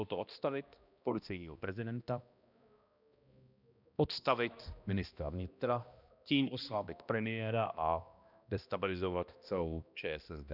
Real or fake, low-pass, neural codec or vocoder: fake; 5.4 kHz; codec, 16 kHz, 1 kbps, X-Codec, HuBERT features, trained on balanced general audio